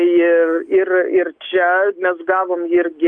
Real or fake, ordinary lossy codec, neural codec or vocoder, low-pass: real; Opus, 32 kbps; none; 9.9 kHz